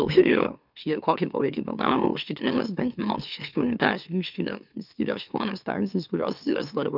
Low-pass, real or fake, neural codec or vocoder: 5.4 kHz; fake; autoencoder, 44.1 kHz, a latent of 192 numbers a frame, MeloTTS